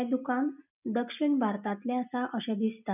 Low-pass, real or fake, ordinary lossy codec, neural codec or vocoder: 3.6 kHz; real; none; none